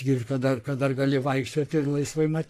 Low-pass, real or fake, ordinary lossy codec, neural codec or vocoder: 14.4 kHz; fake; AAC, 64 kbps; codec, 44.1 kHz, 3.4 kbps, Pupu-Codec